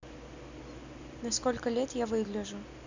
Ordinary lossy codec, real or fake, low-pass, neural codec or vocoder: none; real; 7.2 kHz; none